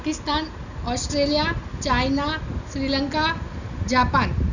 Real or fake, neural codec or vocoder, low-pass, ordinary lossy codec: real; none; 7.2 kHz; none